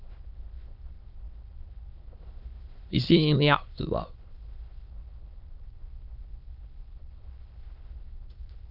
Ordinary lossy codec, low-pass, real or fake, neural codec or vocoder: Opus, 24 kbps; 5.4 kHz; fake; autoencoder, 22.05 kHz, a latent of 192 numbers a frame, VITS, trained on many speakers